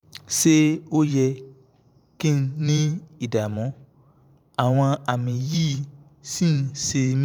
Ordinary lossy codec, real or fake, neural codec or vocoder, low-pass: none; fake; vocoder, 44.1 kHz, 128 mel bands every 512 samples, BigVGAN v2; 19.8 kHz